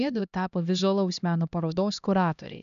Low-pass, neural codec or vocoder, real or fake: 7.2 kHz; codec, 16 kHz, 1 kbps, X-Codec, HuBERT features, trained on LibriSpeech; fake